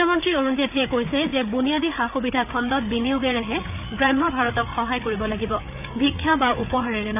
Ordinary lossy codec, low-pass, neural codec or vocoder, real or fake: none; 3.6 kHz; codec, 16 kHz, 8 kbps, FreqCodec, larger model; fake